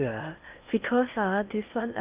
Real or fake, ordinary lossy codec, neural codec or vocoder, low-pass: fake; Opus, 24 kbps; codec, 16 kHz in and 24 kHz out, 0.8 kbps, FocalCodec, streaming, 65536 codes; 3.6 kHz